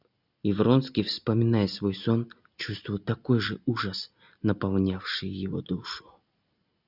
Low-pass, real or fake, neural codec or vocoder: 5.4 kHz; real; none